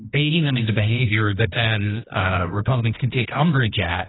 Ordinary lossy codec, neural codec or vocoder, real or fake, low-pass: AAC, 16 kbps; codec, 24 kHz, 0.9 kbps, WavTokenizer, medium music audio release; fake; 7.2 kHz